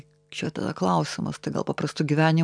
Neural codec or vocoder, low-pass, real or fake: none; 9.9 kHz; real